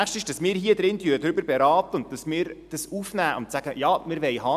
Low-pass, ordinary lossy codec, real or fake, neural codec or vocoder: 14.4 kHz; none; real; none